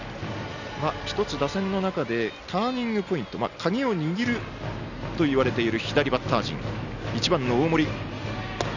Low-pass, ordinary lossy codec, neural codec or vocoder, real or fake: 7.2 kHz; none; none; real